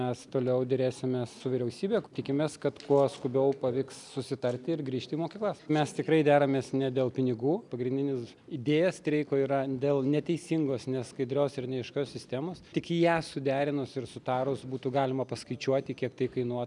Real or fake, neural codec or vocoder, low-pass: real; none; 10.8 kHz